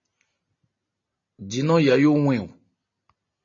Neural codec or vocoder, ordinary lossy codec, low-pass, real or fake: none; MP3, 32 kbps; 7.2 kHz; real